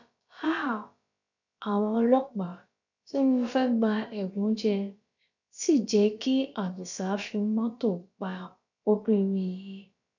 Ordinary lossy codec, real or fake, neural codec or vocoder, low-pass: none; fake; codec, 16 kHz, about 1 kbps, DyCAST, with the encoder's durations; 7.2 kHz